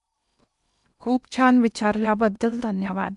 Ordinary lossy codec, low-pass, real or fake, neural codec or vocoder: none; 10.8 kHz; fake; codec, 16 kHz in and 24 kHz out, 0.8 kbps, FocalCodec, streaming, 65536 codes